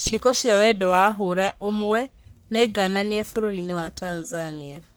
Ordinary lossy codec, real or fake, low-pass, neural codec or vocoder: none; fake; none; codec, 44.1 kHz, 1.7 kbps, Pupu-Codec